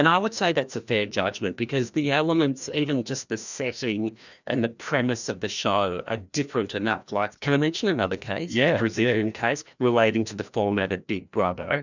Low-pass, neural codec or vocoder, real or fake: 7.2 kHz; codec, 16 kHz, 1 kbps, FreqCodec, larger model; fake